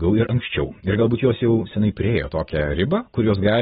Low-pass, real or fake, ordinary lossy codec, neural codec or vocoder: 19.8 kHz; real; AAC, 16 kbps; none